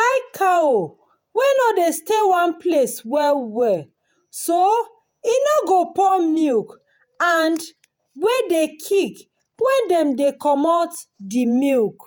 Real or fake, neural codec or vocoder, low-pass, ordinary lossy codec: fake; vocoder, 48 kHz, 128 mel bands, Vocos; none; none